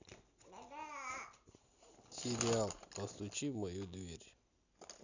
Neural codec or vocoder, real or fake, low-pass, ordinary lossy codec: none; real; 7.2 kHz; none